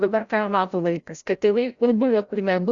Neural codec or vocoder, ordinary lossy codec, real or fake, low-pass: codec, 16 kHz, 0.5 kbps, FreqCodec, larger model; Opus, 64 kbps; fake; 7.2 kHz